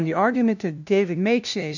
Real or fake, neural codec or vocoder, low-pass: fake; codec, 16 kHz, 0.5 kbps, FunCodec, trained on LibriTTS, 25 frames a second; 7.2 kHz